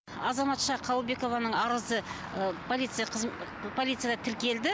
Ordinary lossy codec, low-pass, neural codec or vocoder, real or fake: none; none; none; real